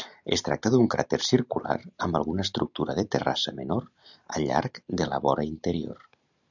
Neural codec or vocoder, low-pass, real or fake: none; 7.2 kHz; real